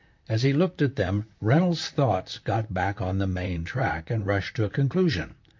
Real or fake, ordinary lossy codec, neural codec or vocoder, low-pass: fake; MP3, 48 kbps; vocoder, 44.1 kHz, 128 mel bands, Pupu-Vocoder; 7.2 kHz